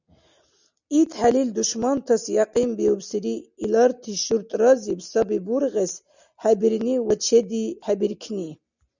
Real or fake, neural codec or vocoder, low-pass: real; none; 7.2 kHz